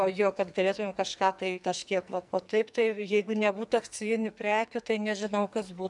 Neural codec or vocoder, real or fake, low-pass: codec, 44.1 kHz, 2.6 kbps, SNAC; fake; 10.8 kHz